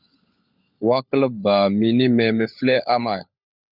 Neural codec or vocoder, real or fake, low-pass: codec, 16 kHz, 16 kbps, FunCodec, trained on LibriTTS, 50 frames a second; fake; 5.4 kHz